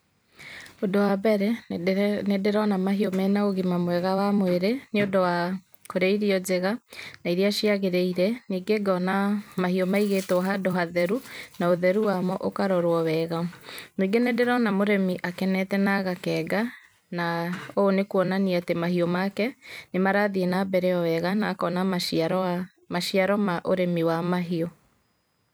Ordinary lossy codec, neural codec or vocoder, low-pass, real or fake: none; vocoder, 44.1 kHz, 128 mel bands every 256 samples, BigVGAN v2; none; fake